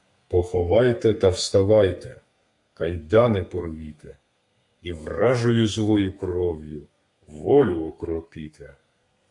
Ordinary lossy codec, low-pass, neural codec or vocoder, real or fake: AAC, 64 kbps; 10.8 kHz; codec, 32 kHz, 1.9 kbps, SNAC; fake